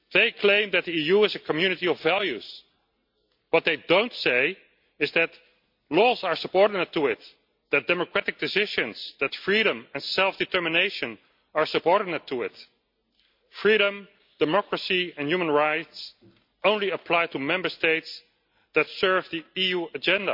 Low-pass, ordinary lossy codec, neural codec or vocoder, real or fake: 5.4 kHz; MP3, 48 kbps; none; real